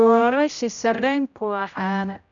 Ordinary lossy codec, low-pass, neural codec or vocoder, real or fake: none; 7.2 kHz; codec, 16 kHz, 0.5 kbps, X-Codec, HuBERT features, trained on general audio; fake